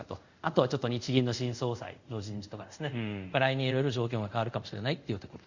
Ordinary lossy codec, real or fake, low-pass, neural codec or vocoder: Opus, 64 kbps; fake; 7.2 kHz; codec, 24 kHz, 0.5 kbps, DualCodec